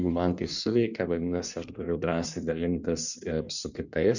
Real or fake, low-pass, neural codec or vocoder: fake; 7.2 kHz; codec, 16 kHz in and 24 kHz out, 1.1 kbps, FireRedTTS-2 codec